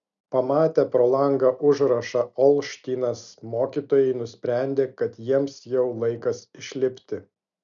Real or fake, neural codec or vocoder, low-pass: real; none; 7.2 kHz